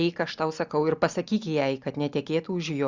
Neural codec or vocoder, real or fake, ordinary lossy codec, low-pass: none; real; Opus, 64 kbps; 7.2 kHz